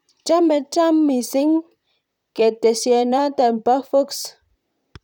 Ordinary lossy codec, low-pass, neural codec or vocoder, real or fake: none; 19.8 kHz; vocoder, 44.1 kHz, 128 mel bands, Pupu-Vocoder; fake